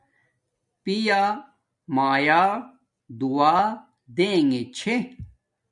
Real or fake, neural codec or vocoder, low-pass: real; none; 10.8 kHz